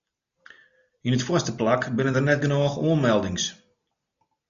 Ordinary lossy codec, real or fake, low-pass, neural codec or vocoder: MP3, 96 kbps; real; 7.2 kHz; none